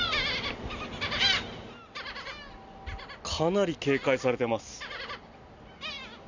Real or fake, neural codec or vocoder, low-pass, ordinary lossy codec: real; none; 7.2 kHz; none